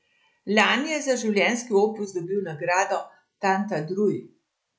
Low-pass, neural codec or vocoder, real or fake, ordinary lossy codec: none; none; real; none